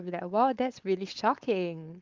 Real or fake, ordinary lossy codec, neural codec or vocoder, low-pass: fake; Opus, 24 kbps; codec, 16 kHz, 4.8 kbps, FACodec; 7.2 kHz